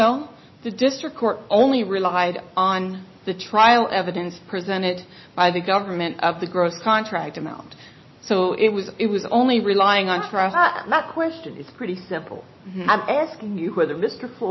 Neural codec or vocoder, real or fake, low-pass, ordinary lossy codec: none; real; 7.2 kHz; MP3, 24 kbps